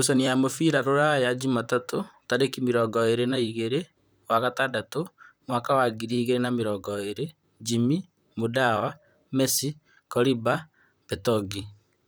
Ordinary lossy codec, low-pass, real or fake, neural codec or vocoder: none; none; fake; vocoder, 44.1 kHz, 128 mel bands, Pupu-Vocoder